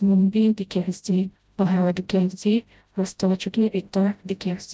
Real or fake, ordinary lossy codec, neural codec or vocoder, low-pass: fake; none; codec, 16 kHz, 0.5 kbps, FreqCodec, smaller model; none